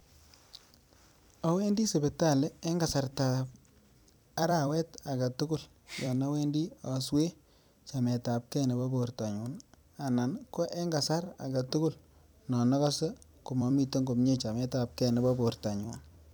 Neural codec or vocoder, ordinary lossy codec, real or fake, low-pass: vocoder, 44.1 kHz, 128 mel bands every 256 samples, BigVGAN v2; none; fake; none